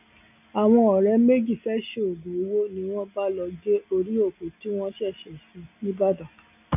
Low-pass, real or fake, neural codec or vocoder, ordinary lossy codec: 3.6 kHz; real; none; none